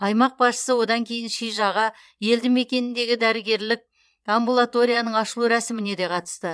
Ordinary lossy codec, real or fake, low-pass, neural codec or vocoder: none; fake; none; vocoder, 22.05 kHz, 80 mel bands, Vocos